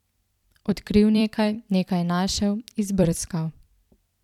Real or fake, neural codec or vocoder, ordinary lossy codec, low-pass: fake; vocoder, 44.1 kHz, 128 mel bands every 256 samples, BigVGAN v2; none; 19.8 kHz